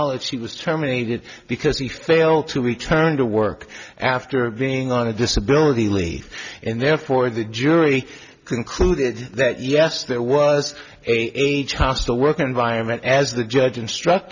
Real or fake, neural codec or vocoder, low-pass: real; none; 7.2 kHz